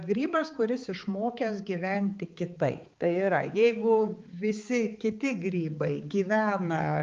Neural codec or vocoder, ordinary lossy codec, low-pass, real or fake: codec, 16 kHz, 4 kbps, X-Codec, HuBERT features, trained on balanced general audio; Opus, 32 kbps; 7.2 kHz; fake